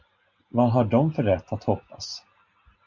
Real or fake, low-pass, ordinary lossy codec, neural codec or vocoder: real; 7.2 kHz; Opus, 64 kbps; none